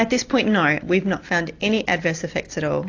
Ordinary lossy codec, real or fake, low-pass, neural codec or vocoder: AAC, 48 kbps; real; 7.2 kHz; none